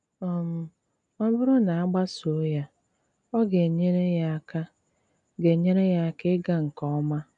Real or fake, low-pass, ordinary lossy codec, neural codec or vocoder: real; 9.9 kHz; none; none